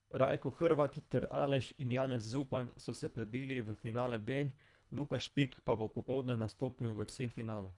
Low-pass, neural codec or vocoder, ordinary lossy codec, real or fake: 10.8 kHz; codec, 24 kHz, 1.5 kbps, HILCodec; none; fake